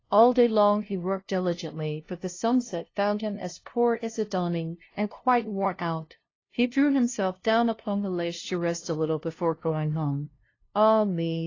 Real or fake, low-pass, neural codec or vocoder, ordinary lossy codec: fake; 7.2 kHz; codec, 16 kHz, 0.5 kbps, FunCodec, trained on LibriTTS, 25 frames a second; AAC, 32 kbps